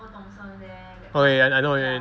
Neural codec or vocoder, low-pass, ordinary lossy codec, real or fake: none; none; none; real